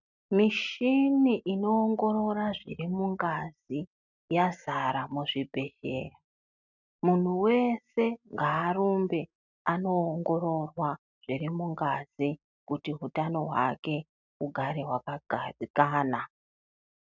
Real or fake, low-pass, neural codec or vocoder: real; 7.2 kHz; none